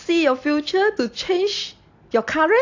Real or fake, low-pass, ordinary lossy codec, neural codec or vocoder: real; 7.2 kHz; AAC, 48 kbps; none